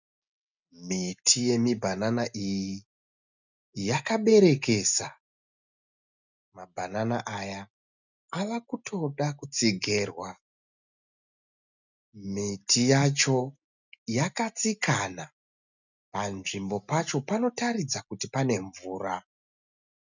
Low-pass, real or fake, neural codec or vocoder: 7.2 kHz; real; none